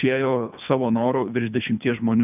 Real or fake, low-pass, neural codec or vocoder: fake; 3.6 kHz; codec, 24 kHz, 3 kbps, HILCodec